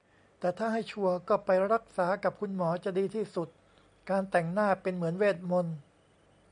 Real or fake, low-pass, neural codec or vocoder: real; 9.9 kHz; none